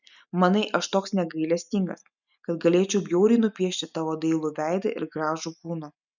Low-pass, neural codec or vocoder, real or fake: 7.2 kHz; none; real